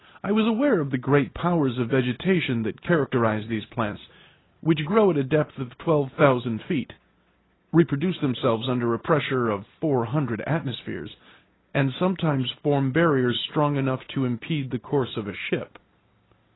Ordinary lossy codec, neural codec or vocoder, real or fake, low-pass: AAC, 16 kbps; none; real; 7.2 kHz